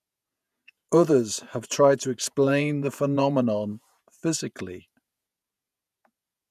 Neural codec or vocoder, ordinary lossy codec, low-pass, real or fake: vocoder, 48 kHz, 128 mel bands, Vocos; none; 14.4 kHz; fake